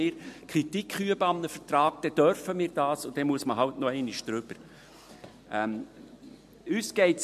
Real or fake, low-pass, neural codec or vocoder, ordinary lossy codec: real; 14.4 kHz; none; MP3, 64 kbps